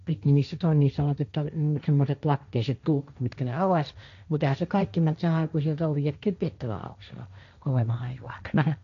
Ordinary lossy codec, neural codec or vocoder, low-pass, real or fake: none; codec, 16 kHz, 1.1 kbps, Voila-Tokenizer; 7.2 kHz; fake